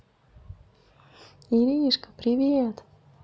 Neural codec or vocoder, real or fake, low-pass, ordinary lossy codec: none; real; none; none